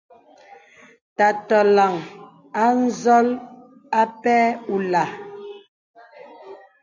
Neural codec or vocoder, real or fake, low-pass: none; real; 7.2 kHz